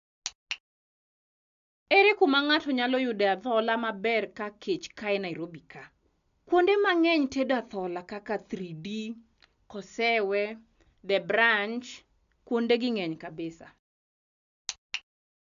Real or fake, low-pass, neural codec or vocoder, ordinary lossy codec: real; 7.2 kHz; none; AAC, 96 kbps